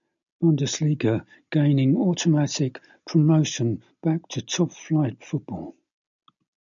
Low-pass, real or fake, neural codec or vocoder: 7.2 kHz; real; none